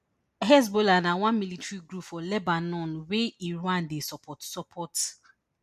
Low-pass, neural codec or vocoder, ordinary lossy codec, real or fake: 14.4 kHz; none; MP3, 64 kbps; real